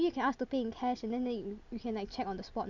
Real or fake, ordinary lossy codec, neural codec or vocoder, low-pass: real; none; none; 7.2 kHz